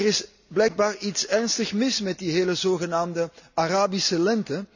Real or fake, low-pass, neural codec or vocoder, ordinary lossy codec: real; 7.2 kHz; none; none